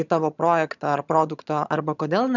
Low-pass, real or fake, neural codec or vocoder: 7.2 kHz; fake; vocoder, 22.05 kHz, 80 mel bands, HiFi-GAN